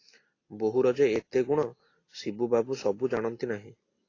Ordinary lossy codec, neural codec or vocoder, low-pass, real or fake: AAC, 32 kbps; none; 7.2 kHz; real